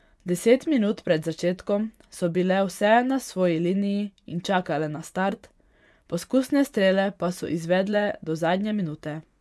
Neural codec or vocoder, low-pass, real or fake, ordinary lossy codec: vocoder, 24 kHz, 100 mel bands, Vocos; none; fake; none